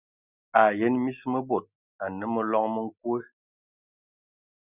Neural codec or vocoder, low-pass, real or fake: none; 3.6 kHz; real